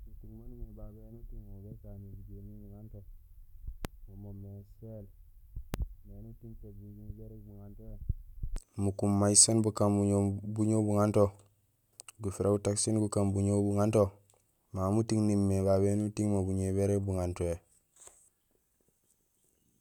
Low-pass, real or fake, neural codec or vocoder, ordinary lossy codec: 19.8 kHz; real; none; none